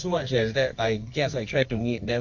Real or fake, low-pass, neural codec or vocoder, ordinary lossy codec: fake; 7.2 kHz; codec, 24 kHz, 0.9 kbps, WavTokenizer, medium music audio release; AAC, 48 kbps